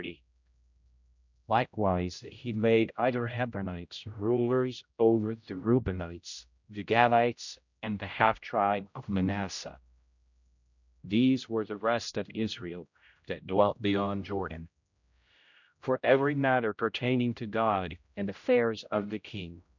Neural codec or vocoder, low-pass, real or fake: codec, 16 kHz, 0.5 kbps, X-Codec, HuBERT features, trained on general audio; 7.2 kHz; fake